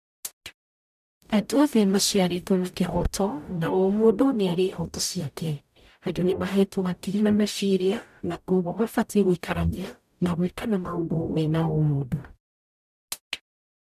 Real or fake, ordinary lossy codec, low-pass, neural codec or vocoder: fake; none; 14.4 kHz; codec, 44.1 kHz, 0.9 kbps, DAC